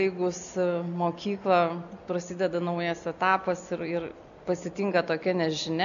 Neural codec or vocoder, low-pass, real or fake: none; 7.2 kHz; real